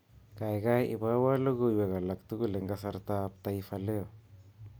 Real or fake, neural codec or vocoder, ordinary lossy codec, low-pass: real; none; none; none